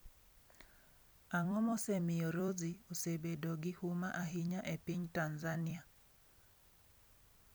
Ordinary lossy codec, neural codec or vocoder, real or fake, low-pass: none; vocoder, 44.1 kHz, 128 mel bands every 256 samples, BigVGAN v2; fake; none